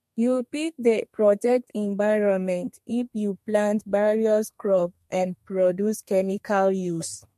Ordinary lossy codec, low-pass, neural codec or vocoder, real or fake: MP3, 64 kbps; 14.4 kHz; codec, 32 kHz, 1.9 kbps, SNAC; fake